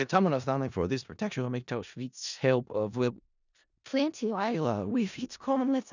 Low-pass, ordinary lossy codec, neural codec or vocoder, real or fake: 7.2 kHz; none; codec, 16 kHz in and 24 kHz out, 0.4 kbps, LongCat-Audio-Codec, four codebook decoder; fake